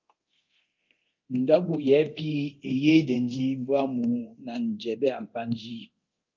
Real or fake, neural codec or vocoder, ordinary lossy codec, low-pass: fake; codec, 24 kHz, 0.9 kbps, DualCodec; Opus, 32 kbps; 7.2 kHz